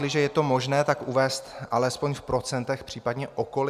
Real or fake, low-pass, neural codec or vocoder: real; 14.4 kHz; none